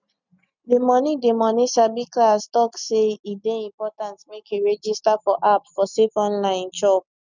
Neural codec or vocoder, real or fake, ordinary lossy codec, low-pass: none; real; none; 7.2 kHz